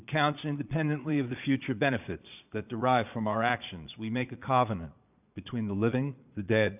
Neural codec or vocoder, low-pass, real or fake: vocoder, 22.05 kHz, 80 mel bands, Vocos; 3.6 kHz; fake